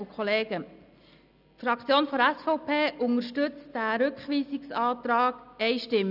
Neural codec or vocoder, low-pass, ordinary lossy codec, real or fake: none; 5.4 kHz; none; real